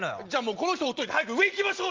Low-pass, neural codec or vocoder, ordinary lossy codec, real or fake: 7.2 kHz; none; Opus, 32 kbps; real